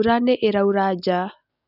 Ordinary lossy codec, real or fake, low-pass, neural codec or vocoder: none; real; 5.4 kHz; none